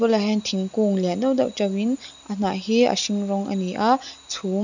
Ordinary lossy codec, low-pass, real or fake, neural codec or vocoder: none; 7.2 kHz; real; none